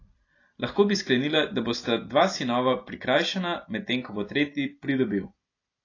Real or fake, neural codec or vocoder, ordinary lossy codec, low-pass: real; none; AAC, 32 kbps; 7.2 kHz